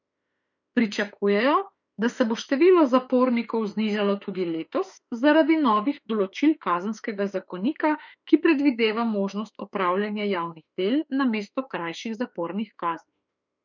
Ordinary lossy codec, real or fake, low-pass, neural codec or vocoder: none; fake; 7.2 kHz; autoencoder, 48 kHz, 32 numbers a frame, DAC-VAE, trained on Japanese speech